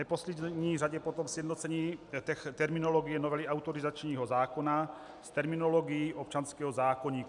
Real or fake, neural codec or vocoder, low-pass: real; none; 10.8 kHz